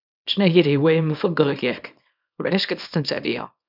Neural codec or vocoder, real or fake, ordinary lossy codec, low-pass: codec, 24 kHz, 0.9 kbps, WavTokenizer, small release; fake; none; 5.4 kHz